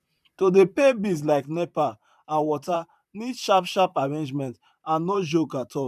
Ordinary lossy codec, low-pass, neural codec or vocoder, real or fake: none; 14.4 kHz; vocoder, 44.1 kHz, 128 mel bands, Pupu-Vocoder; fake